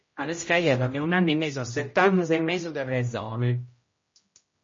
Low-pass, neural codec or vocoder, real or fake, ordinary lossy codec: 7.2 kHz; codec, 16 kHz, 0.5 kbps, X-Codec, HuBERT features, trained on general audio; fake; MP3, 32 kbps